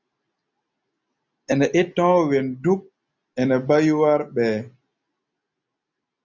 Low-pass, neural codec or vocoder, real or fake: 7.2 kHz; none; real